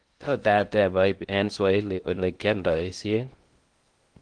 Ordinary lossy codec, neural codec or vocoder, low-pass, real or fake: Opus, 24 kbps; codec, 16 kHz in and 24 kHz out, 0.6 kbps, FocalCodec, streaming, 2048 codes; 9.9 kHz; fake